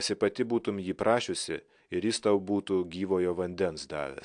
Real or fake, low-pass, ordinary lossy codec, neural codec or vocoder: real; 9.9 kHz; AAC, 64 kbps; none